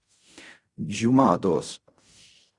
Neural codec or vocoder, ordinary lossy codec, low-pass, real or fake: codec, 16 kHz in and 24 kHz out, 0.4 kbps, LongCat-Audio-Codec, fine tuned four codebook decoder; Opus, 64 kbps; 10.8 kHz; fake